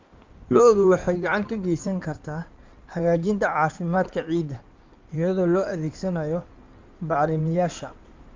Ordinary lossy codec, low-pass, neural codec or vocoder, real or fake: Opus, 24 kbps; 7.2 kHz; codec, 16 kHz in and 24 kHz out, 2.2 kbps, FireRedTTS-2 codec; fake